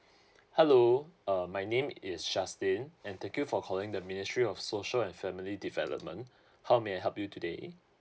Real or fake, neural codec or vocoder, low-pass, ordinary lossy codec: real; none; none; none